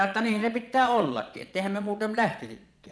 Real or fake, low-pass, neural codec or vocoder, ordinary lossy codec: fake; none; vocoder, 22.05 kHz, 80 mel bands, Vocos; none